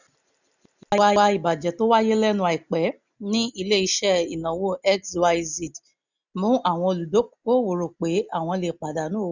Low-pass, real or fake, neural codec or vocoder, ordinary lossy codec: 7.2 kHz; real; none; none